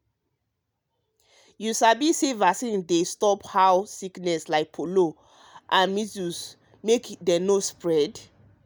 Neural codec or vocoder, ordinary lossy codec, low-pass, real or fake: none; none; none; real